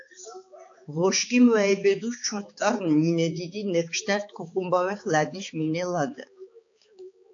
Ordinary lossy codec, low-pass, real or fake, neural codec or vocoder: AAC, 48 kbps; 7.2 kHz; fake; codec, 16 kHz, 4 kbps, X-Codec, HuBERT features, trained on balanced general audio